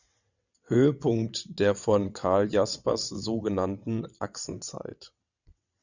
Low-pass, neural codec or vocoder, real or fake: 7.2 kHz; vocoder, 22.05 kHz, 80 mel bands, WaveNeXt; fake